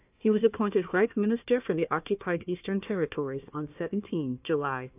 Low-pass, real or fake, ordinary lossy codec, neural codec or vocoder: 3.6 kHz; fake; AAC, 32 kbps; codec, 16 kHz, 1 kbps, FunCodec, trained on Chinese and English, 50 frames a second